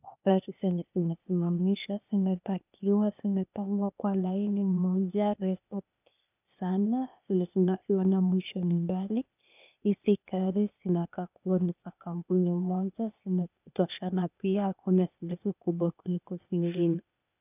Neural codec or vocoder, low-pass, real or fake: codec, 16 kHz, 0.8 kbps, ZipCodec; 3.6 kHz; fake